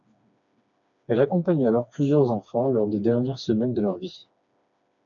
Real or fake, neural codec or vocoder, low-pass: fake; codec, 16 kHz, 2 kbps, FreqCodec, smaller model; 7.2 kHz